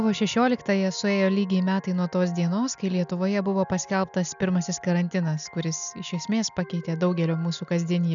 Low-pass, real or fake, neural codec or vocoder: 7.2 kHz; real; none